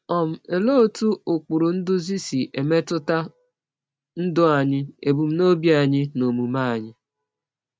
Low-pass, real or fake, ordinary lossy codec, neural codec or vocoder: none; real; none; none